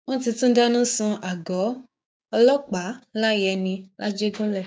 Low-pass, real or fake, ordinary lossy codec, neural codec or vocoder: none; fake; none; codec, 16 kHz, 6 kbps, DAC